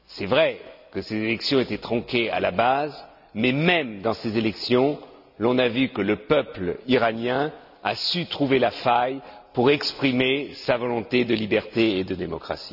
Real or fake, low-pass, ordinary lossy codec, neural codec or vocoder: real; 5.4 kHz; none; none